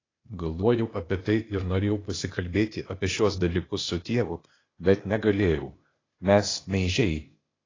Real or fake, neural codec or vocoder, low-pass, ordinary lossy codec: fake; codec, 16 kHz, 0.8 kbps, ZipCodec; 7.2 kHz; AAC, 32 kbps